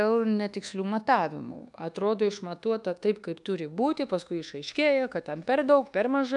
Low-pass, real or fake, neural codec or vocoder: 10.8 kHz; fake; codec, 24 kHz, 1.2 kbps, DualCodec